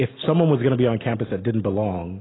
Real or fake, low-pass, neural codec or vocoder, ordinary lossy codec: real; 7.2 kHz; none; AAC, 16 kbps